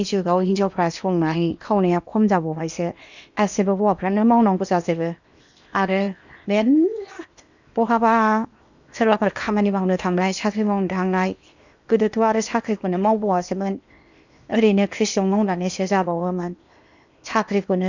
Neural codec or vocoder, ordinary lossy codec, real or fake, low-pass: codec, 16 kHz in and 24 kHz out, 0.8 kbps, FocalCodec, streaming, 65536 codes; none; fake; 7.2 kHz